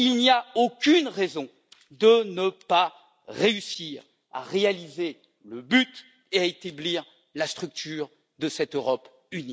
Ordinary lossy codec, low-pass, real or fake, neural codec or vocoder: none; none; real; none